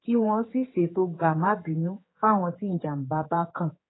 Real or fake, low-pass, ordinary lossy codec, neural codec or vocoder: fake; 7.2 kHz; AAC, 16 kbps; codec, 16 kHz, 4 kbps, X-Codec, HuBERT features, trained on general audio